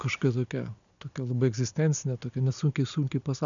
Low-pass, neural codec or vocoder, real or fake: 7.2 kHz; none; real